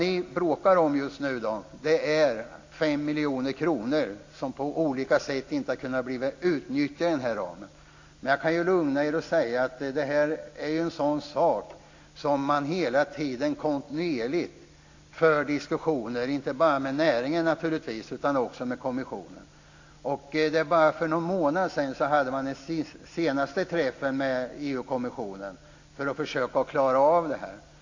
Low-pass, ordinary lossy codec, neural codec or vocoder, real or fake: 7.2 kHz; AAC, 48 kbps; none; real